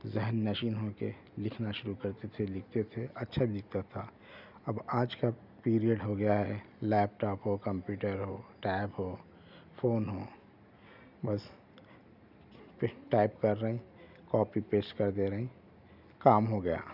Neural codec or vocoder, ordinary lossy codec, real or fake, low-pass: none; none; real; 5.4 kHz